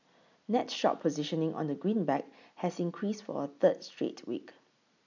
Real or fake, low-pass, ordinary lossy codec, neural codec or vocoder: real; 7.2 kHz; none; none